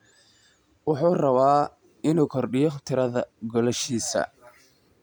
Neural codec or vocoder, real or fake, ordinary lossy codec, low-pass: none; real; none; 19.8 kHz